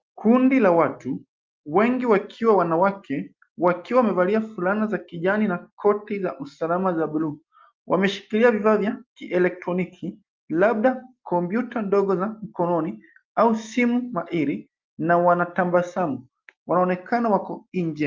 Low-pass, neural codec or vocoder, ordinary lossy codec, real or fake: 7.2 kHz; none; Opus, 24 kbps; real